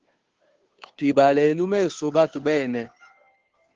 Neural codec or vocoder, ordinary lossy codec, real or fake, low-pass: codec, 16 kHz, 2 kbps, FunCodec, trained on Chinese and English, 25 frames a second; Opus, 16 kbps; fake; 7.2 kHz